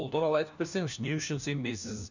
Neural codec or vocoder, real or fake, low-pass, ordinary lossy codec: codec, 16 kHz, 1 kbps, FunCodec, trained on LibriTTS, 50 frames a second; fake; 7.2 kHz; none